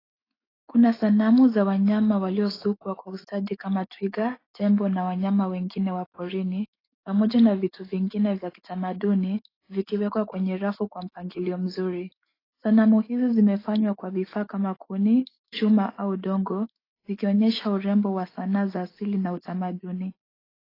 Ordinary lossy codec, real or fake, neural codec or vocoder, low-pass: AAC, 24 kbps; fake; autoencoder, 48 kHz, 128 numbers a frame, DAC-VAE, trained on Japanese speech; 5.4 kHz